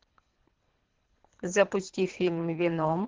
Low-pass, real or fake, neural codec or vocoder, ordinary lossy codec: 7.2 kHz; fake; codec, 24 kHz, 3 kbps, HILCodec; Opus, 16 kbps